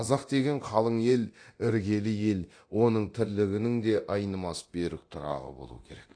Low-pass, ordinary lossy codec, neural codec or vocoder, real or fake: 9.9 kHz; AAC, 48 kbps; codec, 24 kHz, 0.9 kbps, DualCodec; fake